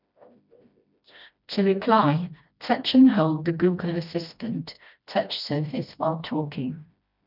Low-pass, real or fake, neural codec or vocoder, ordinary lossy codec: 5.4 kHz; fake; codec, 16 kHz, 1 kbps, FreqCodec, smaller model; none